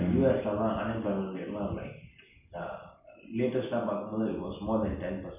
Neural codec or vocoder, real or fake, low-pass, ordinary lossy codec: none; real; 3.6 kHz; none